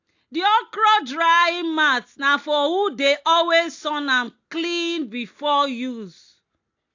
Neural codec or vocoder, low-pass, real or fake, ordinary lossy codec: none; 7.2 kHz; real; AAC, 48 kbps